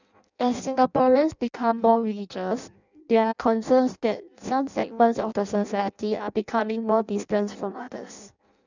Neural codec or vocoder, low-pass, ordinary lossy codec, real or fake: codec, 16 kHz in and 24 kHz out, 0.6 kbps, FireRedTTS-2 codec; 7.2 kHz; none; fake